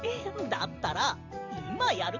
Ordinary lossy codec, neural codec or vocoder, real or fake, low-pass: none; vocoder, 44.1 kHz, 128 mel bands every 512 samples, BigVGAN v2; fake; 7.2 kHz